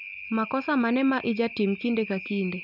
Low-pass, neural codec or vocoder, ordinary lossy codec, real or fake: 5.4 kHz; none; none; real